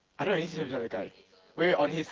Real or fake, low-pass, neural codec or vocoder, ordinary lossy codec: fake; 7.2 kHz; vocoder, 24 kHz, 100 mel bands, Vocos; Opus, 16 kbps